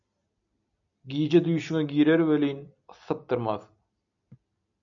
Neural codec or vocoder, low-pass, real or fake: none; 7.2 kHz; real